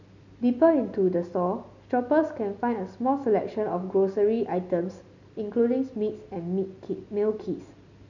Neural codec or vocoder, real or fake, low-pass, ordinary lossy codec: none; real; 7.2 kHz; none